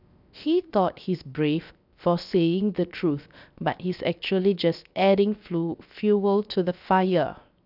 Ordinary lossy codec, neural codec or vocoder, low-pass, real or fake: none; codec, 16 kHz, 0.7 kbps, FocalCodec; 5.4 kHz; fake